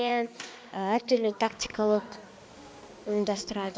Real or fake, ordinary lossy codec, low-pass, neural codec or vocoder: fake; none; none; codec, 16 kHz, 2 kbps, X-Codec, HuBERT features, trained on balanced general audio